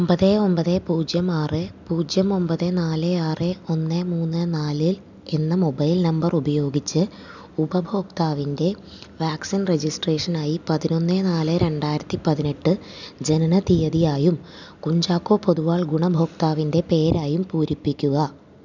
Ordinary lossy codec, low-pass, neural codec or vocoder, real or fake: none; 7.2 kHz; none; real